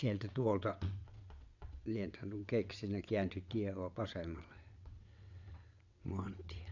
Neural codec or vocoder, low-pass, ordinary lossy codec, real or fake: vocoder, 22.05 kHz, 80 mel bands, WaveNeXt; 7.2 kHz; none; fake